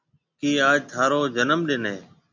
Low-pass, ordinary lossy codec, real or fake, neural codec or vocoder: 7.2 kHz; MP3, 64 kbps; real; none